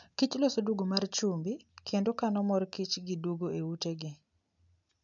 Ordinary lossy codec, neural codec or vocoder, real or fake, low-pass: none; none; real; 7.2 kHz